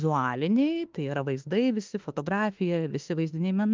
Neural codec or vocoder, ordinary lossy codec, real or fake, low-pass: autoencoder, 48 kHz, 32 numbers a frame, DAC-VAE, trained on Japanese speech; Opus, 32 kbps; fake; 7.2 kHz